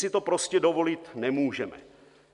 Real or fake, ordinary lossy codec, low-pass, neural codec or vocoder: real; AAC, 96 kbps; 10.8 kHz; none